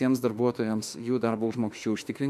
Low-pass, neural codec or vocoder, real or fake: 14.4 kHz; autoencoder, 48 kHz, 32 numbers a frame, DAC-VAE, trained on Japanese speech; fake